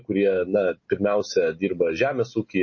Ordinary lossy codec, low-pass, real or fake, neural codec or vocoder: MP3, 32 kbps; 7.2 kHz; real; none